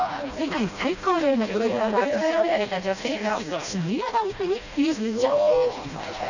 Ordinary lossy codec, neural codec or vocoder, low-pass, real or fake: none; codec, 16 kHz, 1 kbps, FreqCodec, smaller model; 7.2 kHz; fake